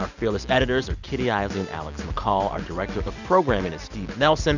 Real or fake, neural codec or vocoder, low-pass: real; none; 7.2 kHz